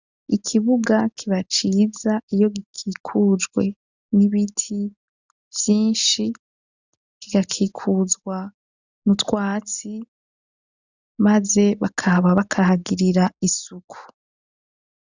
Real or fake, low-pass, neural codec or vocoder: real; 7.2 kHz; none